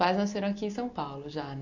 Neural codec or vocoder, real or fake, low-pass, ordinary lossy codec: none; real; 7.2 kHz; none